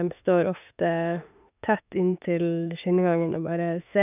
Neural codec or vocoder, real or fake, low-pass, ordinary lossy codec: autoencoder, 48 kHz, 32 numbers a frame, DAC-VAE, trained on Japanese speech; fake; 3.6 kHz; none